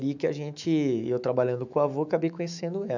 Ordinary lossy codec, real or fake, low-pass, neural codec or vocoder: none; fake; 7.2 kHz; autoencoder, 48 kHz, 128 numbers a frame, DAC-VAE, trained on Japanese speech